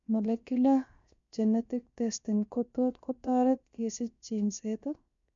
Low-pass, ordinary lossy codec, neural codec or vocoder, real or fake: 7.2 kHz; MP3, 64 kbps; codec, 16 kHz, 0.7 kbps, FocalCodec; fake